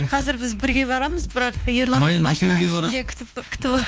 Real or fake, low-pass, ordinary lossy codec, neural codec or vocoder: fake; none; none; codec, 16 kHz, 2 kbps, X-Codec, WavLM features, trained on Multilingual LibriSpeech